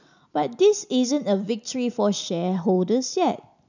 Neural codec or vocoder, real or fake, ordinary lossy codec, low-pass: none; real; none; 7.2 kHz